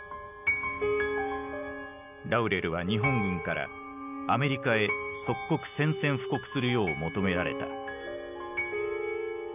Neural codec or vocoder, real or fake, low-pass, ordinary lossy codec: none; real; 3.6 kHz; none